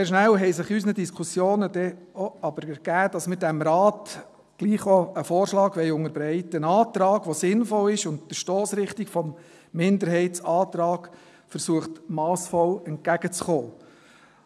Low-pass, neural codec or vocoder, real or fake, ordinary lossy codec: none; none; real; none